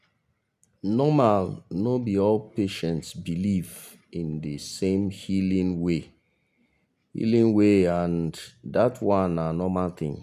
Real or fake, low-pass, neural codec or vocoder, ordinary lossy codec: real; 14.4 kHz; none; none